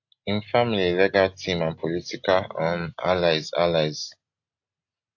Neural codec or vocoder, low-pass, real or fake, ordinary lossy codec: none; 7.2 kHz; real; AAC, 48 kbps